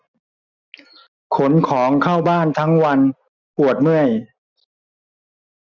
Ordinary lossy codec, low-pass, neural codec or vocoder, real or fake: none; 7.2 kHz; none; real